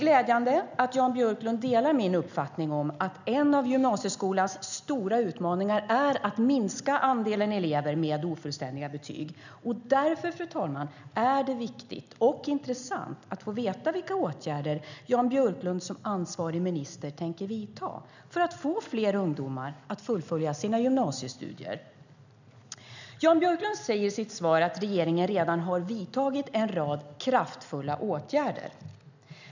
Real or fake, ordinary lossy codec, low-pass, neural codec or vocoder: real; none; 7.2 kHz; none